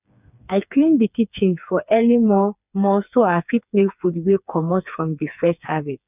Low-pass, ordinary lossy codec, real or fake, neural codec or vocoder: 3.6 kHz; none; fake; codec, 16 kHz, 4 kbps, FreqCodec, smaller model